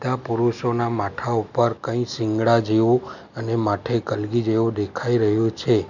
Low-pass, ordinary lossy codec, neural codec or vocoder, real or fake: 7.2 kHz; none; none; real